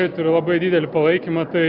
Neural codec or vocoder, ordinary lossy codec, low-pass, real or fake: none; Opus, 64 kbps; 5.4 kHz; real